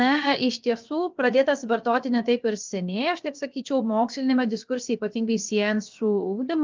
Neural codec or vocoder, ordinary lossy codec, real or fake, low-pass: codec, 16 kHz, 0.7 kbps, FocalCodec; Opus, 24 kbps; fake; 7.2 kHz